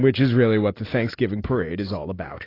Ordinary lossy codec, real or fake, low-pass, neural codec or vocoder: AAC, 24 kbps; real; 5.4 kHz; none